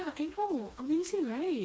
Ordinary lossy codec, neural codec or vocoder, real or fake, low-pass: none; codec, 16 kHz, 2 kbps, FreqCodec, smaller model; fake; none